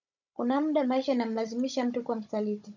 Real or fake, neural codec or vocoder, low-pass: fake; codec, 16 kHz, 16 kbps, FunCodec, trained on Chinese and English, 50 frames a second; 7.2 kHz